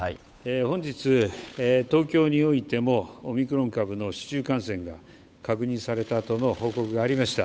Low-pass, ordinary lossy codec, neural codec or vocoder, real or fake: none; none; codec, 16 kHz, 8 kbps, FunCodec, trained on Chinese and English, 25 frames a second; fake